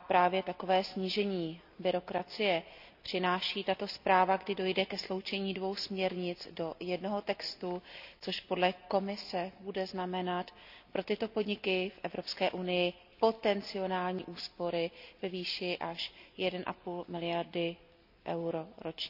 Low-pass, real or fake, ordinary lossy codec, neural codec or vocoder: 5.4 kHz; real; none; none